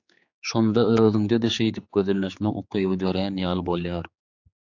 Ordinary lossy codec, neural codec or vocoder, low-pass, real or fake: AAC, 48 kbps; codec, 16 kHz, 4 kbps, X-Codec, HuBERT features, trained on general audio; 7.2 kHz; fake